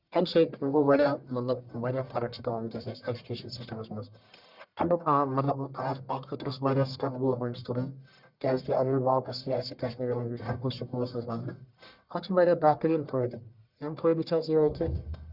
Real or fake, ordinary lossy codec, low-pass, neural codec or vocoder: fake; Opus, 64 kbps; 5.4 kHz; codec, 44.1 kHz, 1.7 kbps, Pupu-Codec